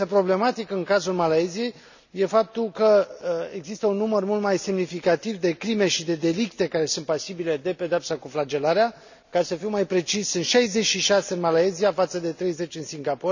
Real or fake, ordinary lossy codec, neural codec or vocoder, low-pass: real; none; none; 7.2 kHz